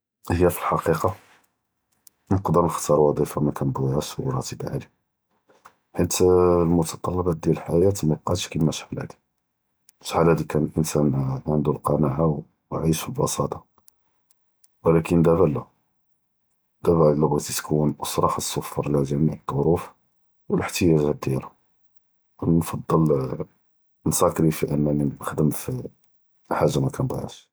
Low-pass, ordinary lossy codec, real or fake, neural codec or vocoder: none; none; real; none